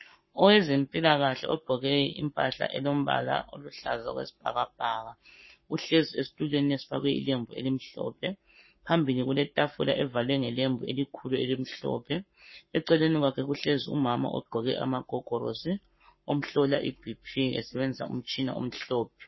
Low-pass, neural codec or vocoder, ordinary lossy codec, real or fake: 7.2 kHz; codec, 44.1 kHz, 7.8 kbps, Pupu-Codec; MP3, 24 kbps; fake